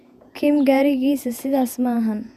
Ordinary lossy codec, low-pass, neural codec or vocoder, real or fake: none; 14.4 kHz; vocoder, 48 kHz, 128 mel bands, Vocos; fake